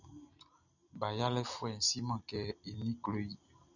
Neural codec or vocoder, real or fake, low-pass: none; real; 7.2 kHz